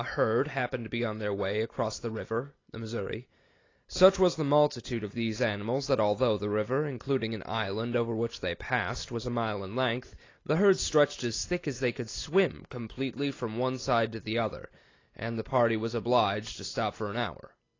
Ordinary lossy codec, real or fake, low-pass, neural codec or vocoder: AAC, 32 kbps; real; 7.2 kHz; none